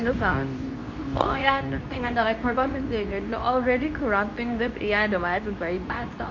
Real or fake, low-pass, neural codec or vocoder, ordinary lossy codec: fake; 7.2 kHz; codec, 24 kHz, 0.9 kbps, WavTokenizer, medium speech release version 2; MP3, 48 kbps